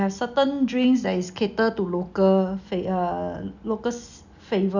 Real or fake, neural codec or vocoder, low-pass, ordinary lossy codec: real; none; 7.2 kHz; none